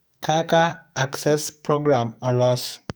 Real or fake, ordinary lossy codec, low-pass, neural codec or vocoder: fake; none; none; codec, 44.1 kHz, 2.6 kbps, SNAC